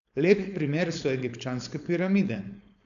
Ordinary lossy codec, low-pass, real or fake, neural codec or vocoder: none; 7.2 kHz; fake; codec, 16 kHz, 4.8 kbps, FACodec